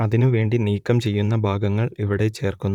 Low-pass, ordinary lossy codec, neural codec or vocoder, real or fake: 19.8 kHz; none; vocoder, 44.1 kHz, 128 mel bands, Pupu-Vocoder; fake